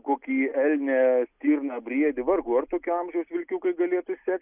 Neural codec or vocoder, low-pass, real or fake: none; 3.6 kHz; real